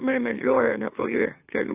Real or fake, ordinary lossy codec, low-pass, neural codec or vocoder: fake; AAC, 24 kbps; 3.6 kHz; autoencoder, 44.1 kHz, a latent of 192 numbers a frame, MeloTTS